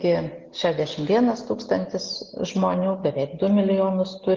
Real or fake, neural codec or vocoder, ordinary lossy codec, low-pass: real; none; Opus, 32 kbps; 7.2 kHz